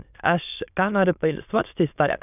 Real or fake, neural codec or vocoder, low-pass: fake; autoencoder, 22.05 kHz, a latent of 192 numbers a frame, VITS, trained on many speakers; 3.6 kHz